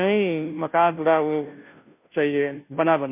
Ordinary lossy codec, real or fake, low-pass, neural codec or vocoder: MP3, 32 kbps; fake; 3.6 kHz; codec, 16 kHz, 0.5 kbps, FunCodec, trained on Chinese and English, 25 frames a second